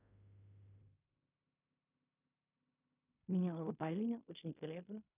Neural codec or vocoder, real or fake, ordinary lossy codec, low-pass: codec, 16 kHz in and 24 kHz out, 0.4 kbps, LongCat-Audio-Codec, fine tuned four codebook decoder; fake; none; 3.6 kHz